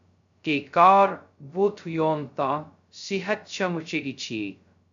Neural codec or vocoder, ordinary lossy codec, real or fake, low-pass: codec, 16 kHz, 0.2 kbps, FocalCodec; AAC, 64 kbps; fake; 7.2 kHz